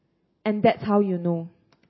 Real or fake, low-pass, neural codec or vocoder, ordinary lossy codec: real; 7.2 kHz; none; MP3, 24 kbps